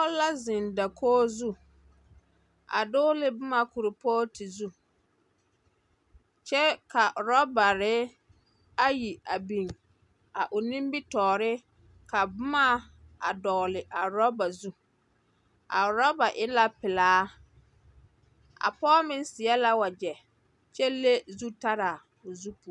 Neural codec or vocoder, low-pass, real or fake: none; 10.8 kHz; real